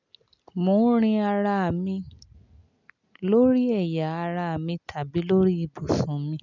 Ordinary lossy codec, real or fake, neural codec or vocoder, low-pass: none; real; none; 7.2 kHz